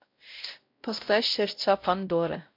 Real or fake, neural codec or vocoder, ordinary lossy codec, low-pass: fake; codec, 16 kHz, 0.5 kbps, X-Codec, WavLM features, trained on Multilingual LibriSpeech; MP3, 48 kbps; 5.4 kHz